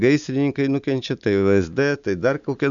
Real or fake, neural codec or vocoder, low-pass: real; none; 7.2 kHz